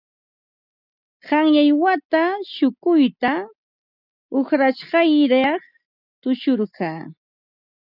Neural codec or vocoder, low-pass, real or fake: none; 5.4 kHz; real